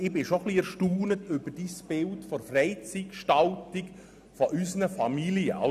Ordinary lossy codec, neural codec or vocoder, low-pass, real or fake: none; none; 14.4 kHz; real